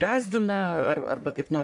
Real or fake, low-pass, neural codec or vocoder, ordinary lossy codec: fake; 10.8 kHz; codec, 44.1 kHz, 1.7 kbps, Pupu-Codec; AAC, 64 kbps